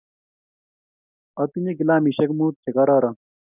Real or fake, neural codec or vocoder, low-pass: real; none; 3.6 kHz